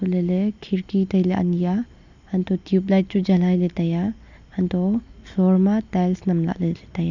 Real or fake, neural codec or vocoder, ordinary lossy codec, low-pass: real; none; none; 7.2 kHz